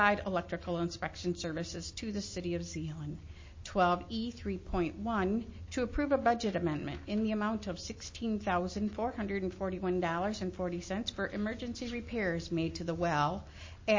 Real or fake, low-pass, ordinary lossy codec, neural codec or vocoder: real; 7.2 kHz; MP3, 32 kbps; none